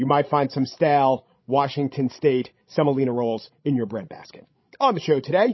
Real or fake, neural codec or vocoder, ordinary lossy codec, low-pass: fake; codec, 16 kHz, 16 kbps, FreqCodec, larger model; MP3, 24 kbps; 7.2 kHz